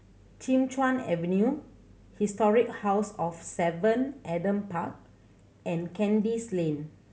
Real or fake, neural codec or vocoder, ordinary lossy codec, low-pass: real; none; none; none